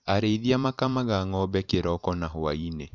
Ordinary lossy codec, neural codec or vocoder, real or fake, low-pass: none; vocoder, 44.1 kHz, 128 mel bands every 512 samples, BigVGAN v2; fake; 7.2 kHz